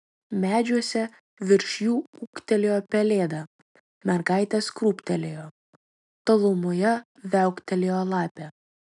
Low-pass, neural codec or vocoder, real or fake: 10.8 kHz; none; real